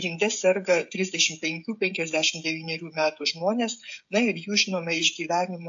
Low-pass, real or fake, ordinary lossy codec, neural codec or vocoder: 7.2 kHz; fake; AAC, 64 kbps; codec, 16 kHz, 16 kbps, FreqCodec, smaller model